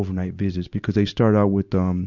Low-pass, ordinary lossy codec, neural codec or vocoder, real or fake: 7.2 kHz; Opus, 64 kbps; none; real